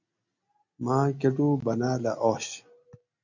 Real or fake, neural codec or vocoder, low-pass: real; none; 7.2 kHz